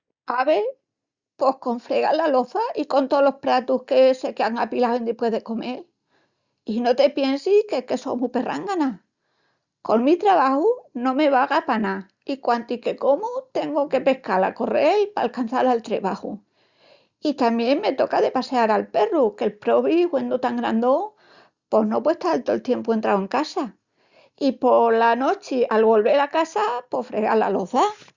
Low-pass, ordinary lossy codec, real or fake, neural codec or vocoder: 7.2 kHz; Opus, 64 kbps; real; none